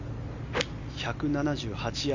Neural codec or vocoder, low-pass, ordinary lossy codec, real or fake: none; 7.2 kHz; none; real